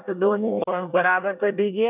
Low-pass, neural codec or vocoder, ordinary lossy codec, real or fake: 3.6 kHz; codec, 24 kHz, 1 kbps, SNAC; none; fake